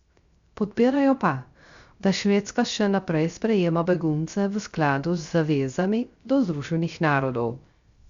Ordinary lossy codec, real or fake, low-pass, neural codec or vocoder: Opus, 64 kbps; fake; 7.2 kHz; codec, 16 kHz, 0.3 kbps, FocalCodec